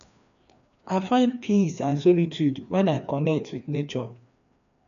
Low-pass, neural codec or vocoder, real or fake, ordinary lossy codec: 7.2 kHz; codec, 16 kHz, 2 kbps, FreqCodec, larger model; fake; none